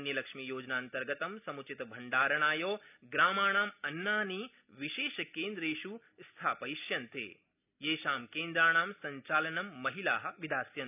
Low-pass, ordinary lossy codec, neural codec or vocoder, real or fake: 3.6 kHz; none; none; real